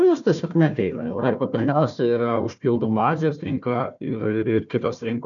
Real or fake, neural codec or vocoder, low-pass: fake; codec, 16 kHz, 1 kbps, FunCodec, trained on Chinese and English, 50 frames a second; 7.2 kHz